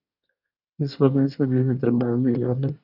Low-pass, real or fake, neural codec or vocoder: 5.4 kHz; fake; codec, 24 kHz, 1 kbps, SNAC